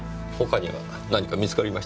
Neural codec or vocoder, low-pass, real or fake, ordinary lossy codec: none; none; real; none